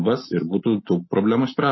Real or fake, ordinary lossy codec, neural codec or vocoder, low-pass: real; MP3, 24 kbps; none; 7.2 kHz